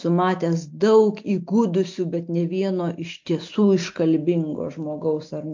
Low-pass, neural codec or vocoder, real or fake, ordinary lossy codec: 7.2 kHz; none; real; MP3, 48 kbps